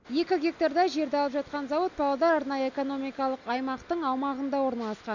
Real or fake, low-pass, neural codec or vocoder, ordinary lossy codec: real; 7.2 kHz; none; none